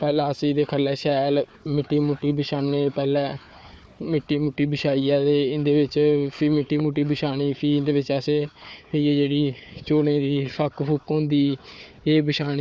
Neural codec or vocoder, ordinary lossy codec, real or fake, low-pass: codec, 16 kHz, 4 kbps, FunCodec, trained on Chinese and English, 50 frames a second; none; fake; none